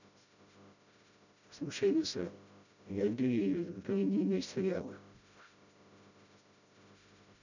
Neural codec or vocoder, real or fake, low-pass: codec, 16 kHz, 0.5 kbps, FreqCodec, smaller model; fake; 7.2 kHz